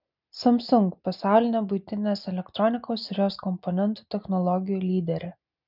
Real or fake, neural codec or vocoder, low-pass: real; none; 5.4 kHz